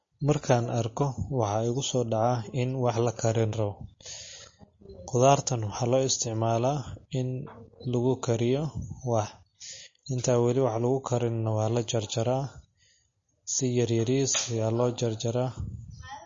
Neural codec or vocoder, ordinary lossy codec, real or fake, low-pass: none; MP3, 32 kbps; real; 7.2 kHz